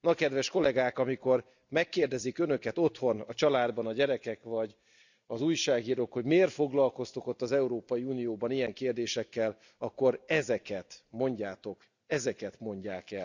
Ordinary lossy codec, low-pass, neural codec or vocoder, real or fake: none; 7.2 kHz; none; real